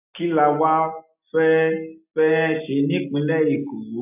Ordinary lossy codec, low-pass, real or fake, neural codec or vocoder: none; 3.6 kHz; real; none